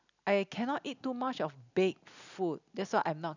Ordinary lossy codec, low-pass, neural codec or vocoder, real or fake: none; 7.2 kHz; none; real